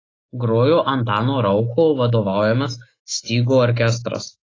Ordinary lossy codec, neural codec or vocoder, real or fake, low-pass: AAC, 32 kbps; autoencoder, 48 kHz, 128 numbers a frame, DAC-VAE, trained on Japanese speech; fake; 7.2 kHz